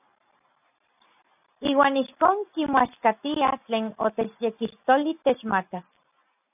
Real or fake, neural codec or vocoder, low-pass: real; none; 3.6 kHz